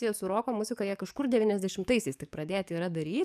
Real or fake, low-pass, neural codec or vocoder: fake; 14.4 kHz; codec, 44.1 kHz, 7.8 kbps, DAC